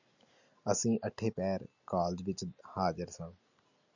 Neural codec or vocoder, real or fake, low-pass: none; real; 7.2 kHz